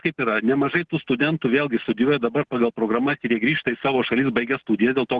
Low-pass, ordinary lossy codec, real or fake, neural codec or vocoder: 10.8 kHz; Opus, 16 kbps; real; none